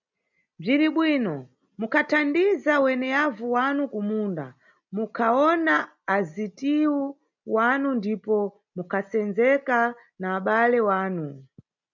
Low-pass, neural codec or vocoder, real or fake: 7.2 kHz; none; real